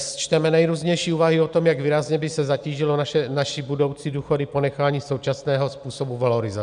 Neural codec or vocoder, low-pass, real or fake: none; 9.9 kHz; real